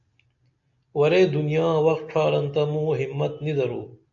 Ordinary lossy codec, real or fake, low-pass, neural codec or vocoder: AAC, 64 kbps; real; 7.2 kHz; none